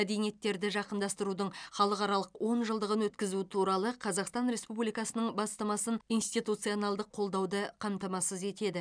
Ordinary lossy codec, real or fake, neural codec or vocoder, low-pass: none; real; none; 9.9 kHz